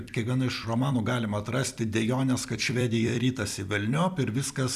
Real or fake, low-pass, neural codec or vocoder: fake; 14.4 kHz; vocoder, 44.1 kHz, 128 mel bands every 256 samples, BigVGAN v2